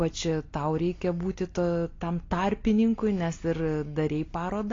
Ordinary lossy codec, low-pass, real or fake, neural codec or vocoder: AAC, 32 kbps; 7.2 kHz; real; none